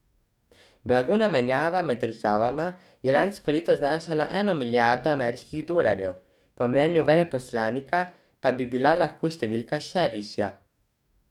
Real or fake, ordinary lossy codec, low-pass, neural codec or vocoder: fake; none; 19.8 kHz; codec, 44.1 kHz, 2.6 kbps, DAC